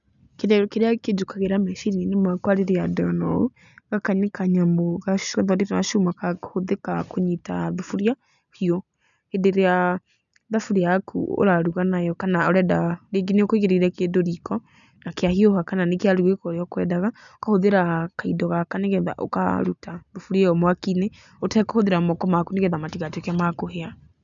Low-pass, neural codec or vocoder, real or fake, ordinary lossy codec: 7.2 kHz; none; real; none